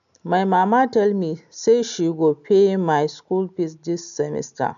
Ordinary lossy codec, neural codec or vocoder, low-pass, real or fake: none; none; 7.2 kHz; real